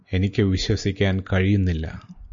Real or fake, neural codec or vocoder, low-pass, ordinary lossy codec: real; none; 7.2 kHz; MP3, 48 kbps